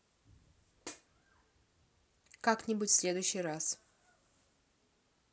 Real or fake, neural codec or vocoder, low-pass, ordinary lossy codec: real; none; none; none